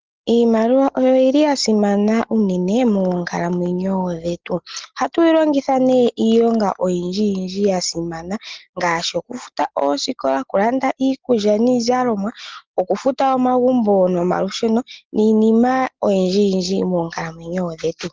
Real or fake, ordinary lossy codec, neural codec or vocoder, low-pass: real; Opus, 16 kbps; none; 7.2 kHz